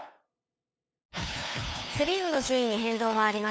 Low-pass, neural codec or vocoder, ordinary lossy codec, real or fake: none; codec, 16 kHz, 2 kbps, FunCodec, trained on LibriTTS, 25 frames a second; none; fake